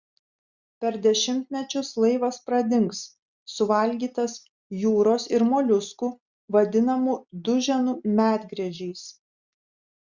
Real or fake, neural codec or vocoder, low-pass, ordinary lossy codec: real; none; 7.2 kHz; Opus, 64 kbps